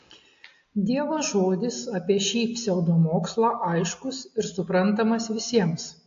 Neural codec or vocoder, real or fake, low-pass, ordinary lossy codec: none; real; 7.2 kHz; AAC, 48 kbps